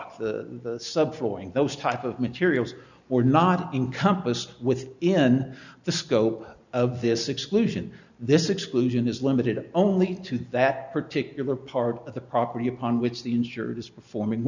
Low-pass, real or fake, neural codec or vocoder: 7.2 kHz; real; none